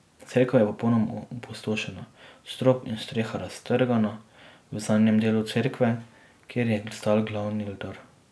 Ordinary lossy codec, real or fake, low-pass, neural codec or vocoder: none; real; none; none